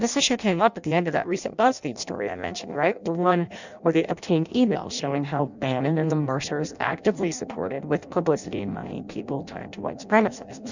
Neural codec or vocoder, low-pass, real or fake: codec, 16 kHz in and 24 kHz out, 0.6 kbps, FireRedTTS-2 codec; 7.2 kHz; fake